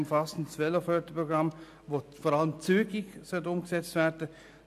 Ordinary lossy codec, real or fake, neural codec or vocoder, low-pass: AAC, 96 kbps; real; none; 14.4 kHz